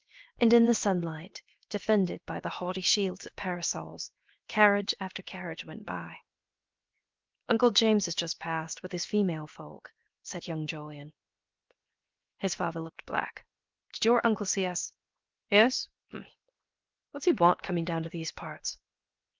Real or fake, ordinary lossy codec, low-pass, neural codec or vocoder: fake; Opus, 32 kbps; 7.2 kHz; codec, 16 kHz, 0.7 kbps, FocalCodec